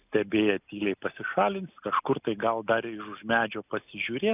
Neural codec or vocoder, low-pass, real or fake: none; 3.6 kHz; real